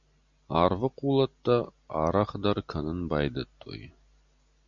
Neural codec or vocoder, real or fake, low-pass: none; real; 7.2 kHz